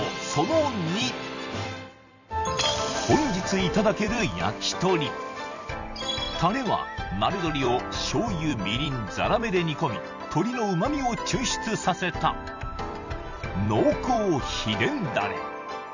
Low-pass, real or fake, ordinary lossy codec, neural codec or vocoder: 7.2 kHz; fake; none; vocoder, 44.1 kHz, 128 mel bands every 256 samples, BigVGAN v2